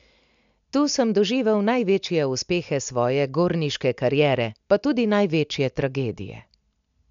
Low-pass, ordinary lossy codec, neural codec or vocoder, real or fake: 7.2 kHz; MP3, 64 kbps; none; real